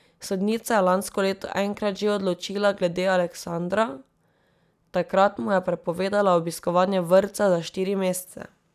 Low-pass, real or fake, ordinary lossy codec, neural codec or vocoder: 14.4 kHz; real; none; none